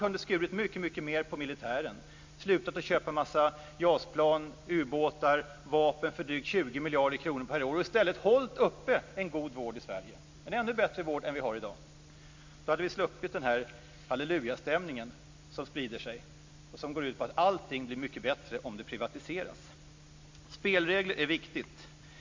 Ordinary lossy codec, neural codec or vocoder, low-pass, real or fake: MP3, 48 kbps; none; 7.2 kHz; real